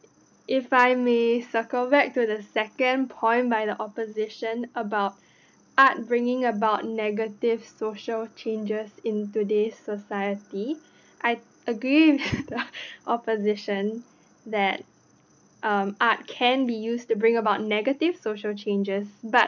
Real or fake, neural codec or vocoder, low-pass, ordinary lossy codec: real; none; 7.2 kHz; none